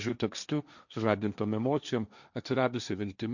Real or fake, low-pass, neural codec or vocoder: fake; 7.2 kHz; codec, 16 kHz, 1.1 kbps, Voila-Tokenizer